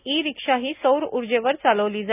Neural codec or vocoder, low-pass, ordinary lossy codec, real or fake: none; 3.6 kHz; none; real